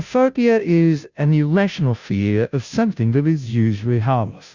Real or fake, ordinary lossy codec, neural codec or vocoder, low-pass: fake; Opus, 64 kbps; codec, 16 kHz, 0.5 kbps, FunCodec, trained on Chinese and English, 25 frames a second; 7.2 kHz